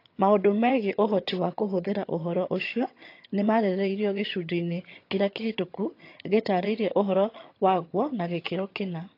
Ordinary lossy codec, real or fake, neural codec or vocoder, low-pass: AAC, 32 kbps; fake; vocoder, 22.05 kHz, 80 mel bands, HiFi-GAN; 5.4 kHz